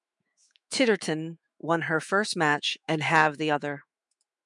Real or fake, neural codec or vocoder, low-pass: fake; autoencoder, 48 kHz, 128 numbers a frame, DAC-VAE, trained on Japanese speech; 10.8 kHz